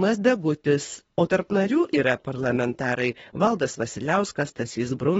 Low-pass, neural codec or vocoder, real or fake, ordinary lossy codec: 10.8 kHz; codec, 24 kHz, 3 kbps, HILCodec; fake; AAC, 24 kbps